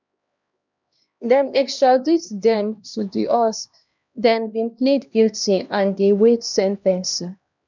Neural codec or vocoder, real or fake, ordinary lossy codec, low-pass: codec, 16 kHz, 1 kbps, X-Codec, HuBERT features, trained on LibriSpeech; fake; none; 7.2 kHz